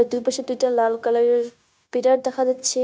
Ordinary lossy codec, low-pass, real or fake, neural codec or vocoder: none; none; fake; codec, 16 kHz, 0.9 kbps, LongCat-Audio-Codec